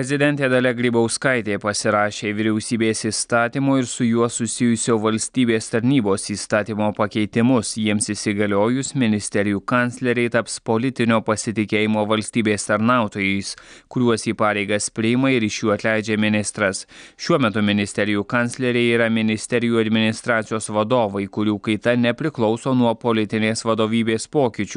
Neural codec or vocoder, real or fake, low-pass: none; real; 9.9 kHz